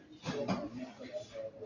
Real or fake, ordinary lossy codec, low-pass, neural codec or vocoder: real; AAC, 48 kbps; 7.2 kHz; none